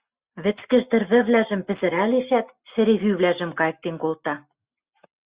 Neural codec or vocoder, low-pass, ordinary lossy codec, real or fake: none; 3.6 kHz; Opus, 64 kbps; real